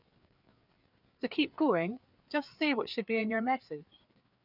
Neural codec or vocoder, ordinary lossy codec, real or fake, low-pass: codec, 16 kHz, 4 kbps, FreqCodec, larger model; none; fake; 5.4 kHz